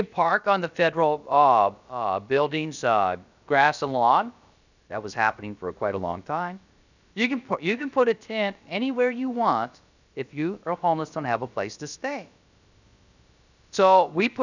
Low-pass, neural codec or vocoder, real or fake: 7.2 kHz; codec, 16 kHz, about 1 kbps, DyCAST, with the encoder's durations; fake